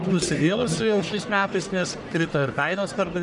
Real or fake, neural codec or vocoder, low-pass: fake; codec, 44.1 kHz, 1.7 kbps, Pupu-Codec; 10.8 kHz